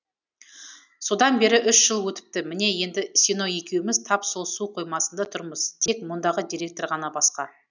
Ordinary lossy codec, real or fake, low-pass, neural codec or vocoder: none; real; 7.2 kHz; none